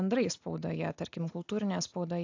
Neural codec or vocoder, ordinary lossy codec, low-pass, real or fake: none; AAC, 48 kbps; 7.2 kHz; real